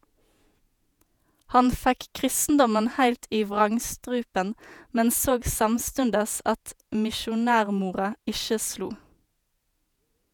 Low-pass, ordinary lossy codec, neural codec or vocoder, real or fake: none; none; vocoder, 48 kHz, 128 mel bands, Vocos; fake